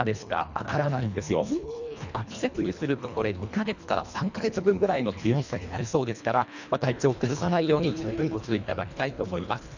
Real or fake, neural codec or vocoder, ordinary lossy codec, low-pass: fake; codec, 24 kHz, 1.5 kbps, HILCodec; none; 7.2 kHz